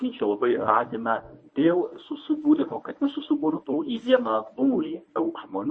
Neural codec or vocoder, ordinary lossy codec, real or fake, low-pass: codec, 24 kHz, 0.9 kbps, WavTokenizer, medium speech release version 1; MP3, 32 kbps; fake; 9.9 kHz